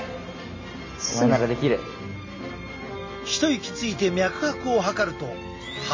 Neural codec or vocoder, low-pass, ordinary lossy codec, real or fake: none; 7.2 kHz; MP3, 32 kbps; real